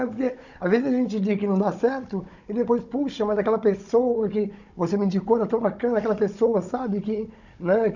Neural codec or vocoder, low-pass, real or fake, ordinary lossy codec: codec, 16 kHz, 16 kbps, FunCodec, trained on Chinese and English, 50 frames a second; 7.2 kHz; fake; none